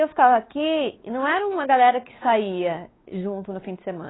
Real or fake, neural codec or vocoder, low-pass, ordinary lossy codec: fake; codec, 16 kHz, 4 kbps, X-Codec, WavLM features, trained on Multilingual LibriSpeech; 7.2 kHz; AAC, 16 kbps